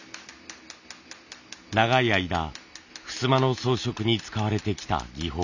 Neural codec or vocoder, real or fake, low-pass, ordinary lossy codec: none; real; 7.2 kHz; none